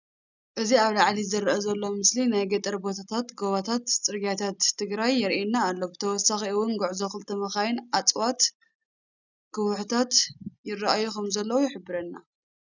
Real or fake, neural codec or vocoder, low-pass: real; none; 7.2 kHz